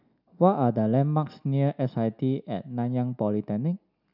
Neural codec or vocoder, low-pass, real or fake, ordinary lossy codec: none; 5.4 kHz; real; none